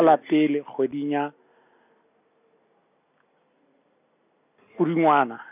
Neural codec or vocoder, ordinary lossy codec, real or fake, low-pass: none; none; real; 3.6 kHz